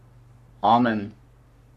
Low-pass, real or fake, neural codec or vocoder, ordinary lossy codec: 14.4 kHz; fake; codec, 44.1 kHz, 3.4 kbps, Pupu-Codec; MP3, 64 kbps